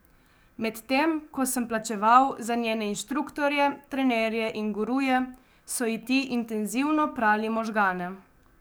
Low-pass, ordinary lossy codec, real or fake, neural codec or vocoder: none; none; fake; codec, 44.1 kHz, 7.8 kbps, DAC